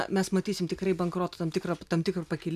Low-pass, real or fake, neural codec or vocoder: 14.4 kHz; real; none